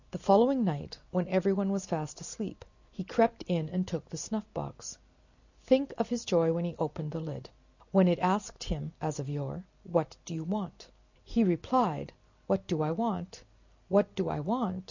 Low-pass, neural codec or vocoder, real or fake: 7.2 kHz; none; real